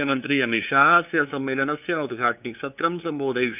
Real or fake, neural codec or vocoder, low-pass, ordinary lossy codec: fake; codec, 16 kHz, 2 kbps, FunCodec, trained on LibriTTS, 25 frames a second; 3.6 kHz; none